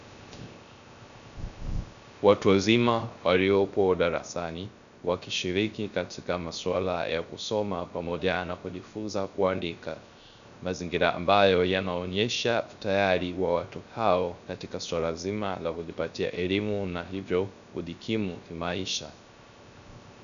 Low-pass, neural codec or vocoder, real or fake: 7.2 kHz; codec, 16 kHz, 0.3 kbps, FocalCodec; fake